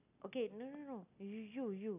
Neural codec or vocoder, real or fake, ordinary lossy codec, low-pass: none; real; none; 3.6 kHz